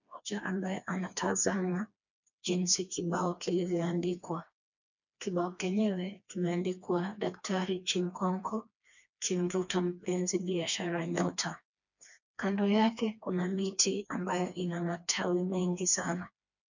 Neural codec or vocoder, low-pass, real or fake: codec, 16 kHz, 2 kbps, FreqCodec, smaller model; 7.2 kHz; fake